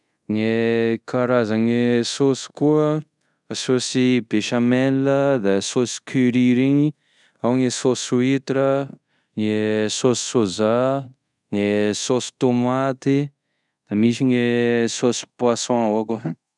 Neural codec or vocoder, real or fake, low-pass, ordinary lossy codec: codec, 24 kHz, 0.5 kbps, DualCodec; fake; 10.8 kHz; none